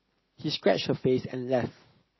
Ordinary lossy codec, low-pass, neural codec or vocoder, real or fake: MP3, 24 kbps; 7.2 kHz; none; real